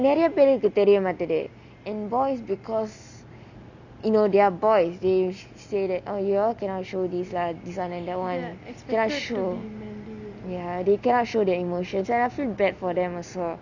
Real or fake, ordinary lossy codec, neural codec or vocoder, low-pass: real; none; none; 7.2 kHz